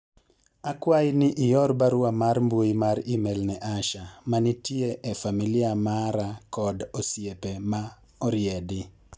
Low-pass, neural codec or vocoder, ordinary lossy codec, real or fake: none; none; none; real